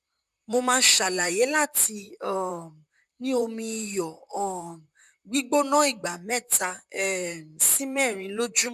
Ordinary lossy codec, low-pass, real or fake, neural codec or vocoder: none; 14.4 kHz; fake; vocoder, 44.1 kHz, 128 mel bands, Pupu-Vocoder